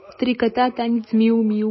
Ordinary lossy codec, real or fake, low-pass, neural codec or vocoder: MP3, 24 kbps; fake; 7.2 kHz; autoencoder, 48 kHz, 128 numbers a frame, DAC-VAE, trained on Japanese speech